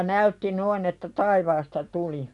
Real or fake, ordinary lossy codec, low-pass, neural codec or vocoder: real; none; 10.8 kHz; none